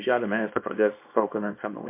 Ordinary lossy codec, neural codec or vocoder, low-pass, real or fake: MP3, 24 kbps; codec, 24 kHz, 0.9 kbps, WavTokenizer, small release; 3.6 kHz; fake